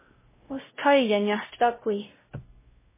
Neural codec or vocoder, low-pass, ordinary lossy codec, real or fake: codec, 16 kHz, 0.5 kbps, X-Codec, WavLM features, trained on Multilingual LibriSpeech; 3.6 kHz; MP3, 16 kbps; fake